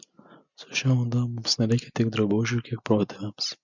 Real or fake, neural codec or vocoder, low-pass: real; none; 7.2 kHz